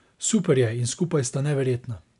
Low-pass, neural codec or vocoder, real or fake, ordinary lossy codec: 10.8 kHz; none; real; none